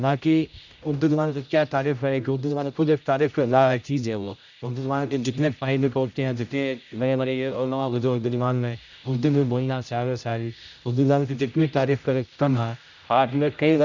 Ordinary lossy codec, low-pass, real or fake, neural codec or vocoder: none; 7.2 kHz; fake; codec, 16 kHz, 0.5 kbps, X-Codec, HuBERT features, trained on general audio